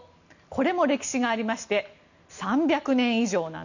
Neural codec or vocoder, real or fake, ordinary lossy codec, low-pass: none; real; none; 7.2 kHz